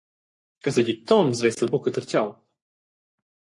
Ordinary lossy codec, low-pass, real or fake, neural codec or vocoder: MP3, 48 kbps; 10.8 kHz; fake; codec, 44.1 kHz, 3.4 kbps, Pupu-Codec